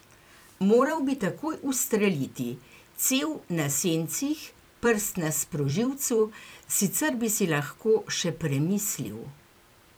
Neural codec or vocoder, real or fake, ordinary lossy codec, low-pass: vocoder, 44.1 kHz, 128 mel bands every 256 samples, BigVGAN v2; fake; none; none